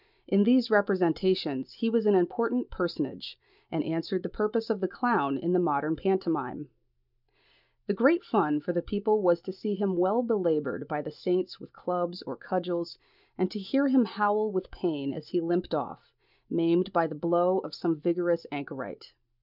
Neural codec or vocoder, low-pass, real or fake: none; 5.4 kHz; real